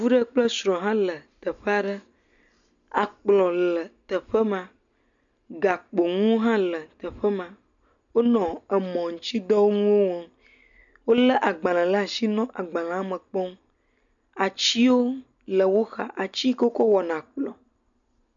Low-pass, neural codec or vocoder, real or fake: 7.2 kHz; none; real